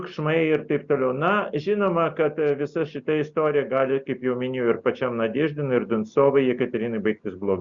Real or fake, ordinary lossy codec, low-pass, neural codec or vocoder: real; Opus, 64 kbps; 7.2 kHz; none